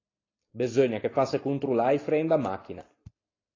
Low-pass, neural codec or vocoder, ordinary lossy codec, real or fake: 7.2 kHz; none; AAC, 32 kbps; real